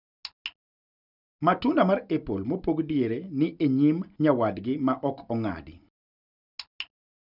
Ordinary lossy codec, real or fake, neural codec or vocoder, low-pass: none; real; none; 5.4 kHz